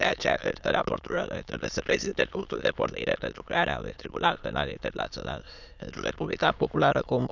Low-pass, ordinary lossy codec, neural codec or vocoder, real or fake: 7.2 kHz; none; autoencoder, 22.05 kHz, a latent of 192 numbers a frame, VITS, trained on many speakers; fake